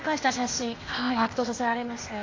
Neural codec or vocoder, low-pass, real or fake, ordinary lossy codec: codec, 16 kHz, 0.8 kbps, ZipCodec; 7.2 kHz; fake; AAC, 32 kbps